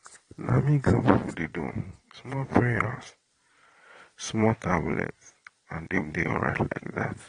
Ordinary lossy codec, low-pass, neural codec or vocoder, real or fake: AAC, 32 kbps; 9.9 kHz; vocoder, 22.05 kHz, 80 mel bands, Vocos; fake